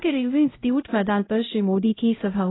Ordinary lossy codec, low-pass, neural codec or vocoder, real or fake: AAC, 16 kbps; 7.2 kHz; codec, 16 kHz, 0.5 kbps, X-Codec, HuBERT features, trained on LibriSpeech; fake